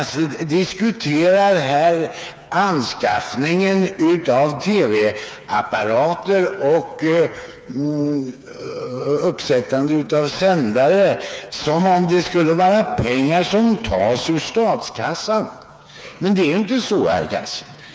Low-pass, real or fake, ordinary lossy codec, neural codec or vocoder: none; fake; none; codec, 16 kHz, 4 kbps, FreqCodec, smaller model